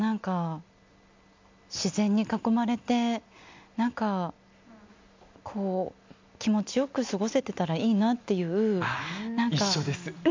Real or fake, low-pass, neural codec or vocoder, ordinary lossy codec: real; 7.2 kHz; none; MP3, 64 kbps